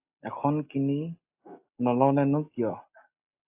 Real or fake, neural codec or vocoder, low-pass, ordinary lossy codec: real; none; 3.6 kHz; AAC, 32 kbps